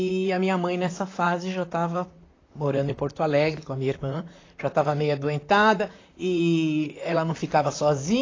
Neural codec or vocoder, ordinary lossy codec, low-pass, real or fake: vocoder, 44.1 kHz, 128 mel bands, Pupu-Vocoder; AAC, 32 kbps; 7.2 kHz; fake